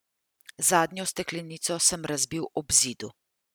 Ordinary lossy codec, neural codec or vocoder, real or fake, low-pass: none; none; real; none